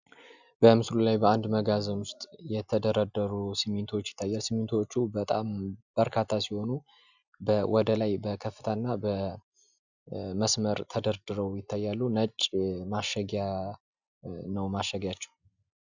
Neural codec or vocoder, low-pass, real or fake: none; 7.2 kHz; real